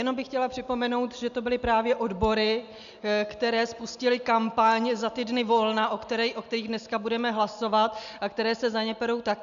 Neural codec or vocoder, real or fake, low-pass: none; real; 7.2 kHz